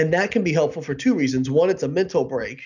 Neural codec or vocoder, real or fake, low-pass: none; real; 7.2 kHz